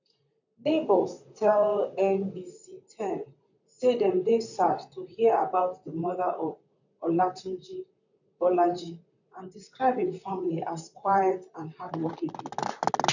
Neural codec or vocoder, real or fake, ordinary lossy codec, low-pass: vocoder, 44.1 kHz, 128 mel bands, Pupu-Vocoder; fake; none; 7.2 kHz